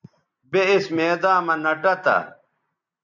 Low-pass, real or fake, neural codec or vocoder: 7.2 kHz; real; none